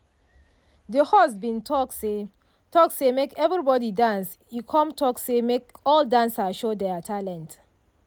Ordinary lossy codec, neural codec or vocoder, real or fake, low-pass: none; none; real; none